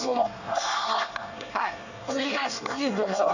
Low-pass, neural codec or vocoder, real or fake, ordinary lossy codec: 7.2 kHz; codec, 24 kHz, 1 kbps, SNAC; fake; none